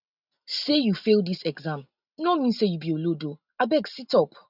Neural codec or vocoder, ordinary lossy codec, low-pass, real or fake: none; none; 5.4 kHz; real